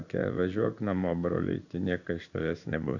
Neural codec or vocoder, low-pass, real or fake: codec, 16 kHz in and 24 kHz out, 1 kbps, XY-Tokenizer; 7.2 kHz; fake